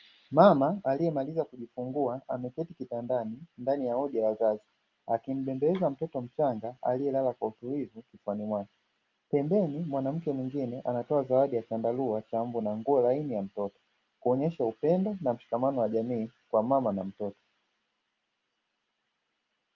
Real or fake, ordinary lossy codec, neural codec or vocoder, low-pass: real; Opus, 32 kbps; none; 7.2 kHz